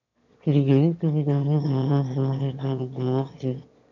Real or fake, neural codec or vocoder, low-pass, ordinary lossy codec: fake; autoencoder, 22.05 kHz, a latent of 192 numbers a frame, VITS, trained on one speaker; 7.2 kHz; none